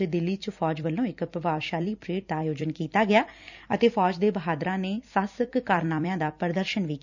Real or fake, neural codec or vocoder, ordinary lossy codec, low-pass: fake; vocoder, 44.1 kHz, 128 mel bands every 256 samples, BigVGAN v2; none; 7.2 kHz